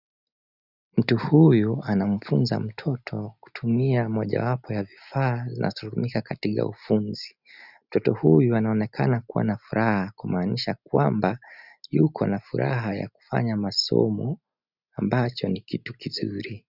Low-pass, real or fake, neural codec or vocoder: 5.4 kHz; real; none